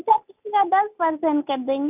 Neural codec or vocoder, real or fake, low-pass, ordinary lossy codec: none; real; 3.6 kHz; none